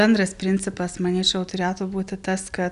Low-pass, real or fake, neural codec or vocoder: 10.8 kHz; real; none